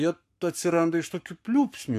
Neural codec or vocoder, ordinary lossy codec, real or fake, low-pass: codec, 44.1 kHz, 7.8 kbps, Pupu-Codec; MP3, 96 kbps; fake; 14.4 kHz